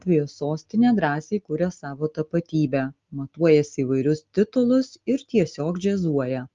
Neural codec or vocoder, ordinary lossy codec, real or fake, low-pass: none; Opus, 32 kbps; real; 7.2 kHz